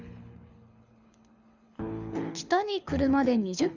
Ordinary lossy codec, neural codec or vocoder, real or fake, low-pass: Opus, 64 kbps; codec, 24 kHz, 6 kbps, HILCodec; fake; 7.2 kHz